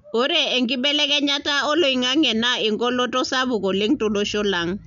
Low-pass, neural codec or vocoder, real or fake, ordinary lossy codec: 7.2 kHz; none; real; none